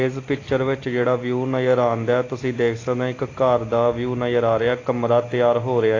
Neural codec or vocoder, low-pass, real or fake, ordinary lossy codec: none; 7.2 kHz; real; AAC, 32 kbps